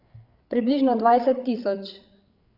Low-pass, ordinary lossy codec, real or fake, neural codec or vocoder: 5.4 kHz; none; fake; codec, 16 kHz, 8 kbps, FreqCodec, larger model